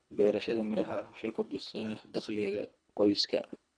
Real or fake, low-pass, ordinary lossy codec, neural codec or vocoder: fake; 9.9 kHz; none; codec, 24 kHz, 1.5 kbps, HILCodec